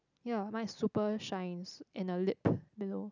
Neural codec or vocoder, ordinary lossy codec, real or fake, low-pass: none; none; real; 7.2 kHz